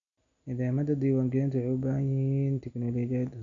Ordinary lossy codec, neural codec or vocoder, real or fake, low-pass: none; none; real; 7.2 kHz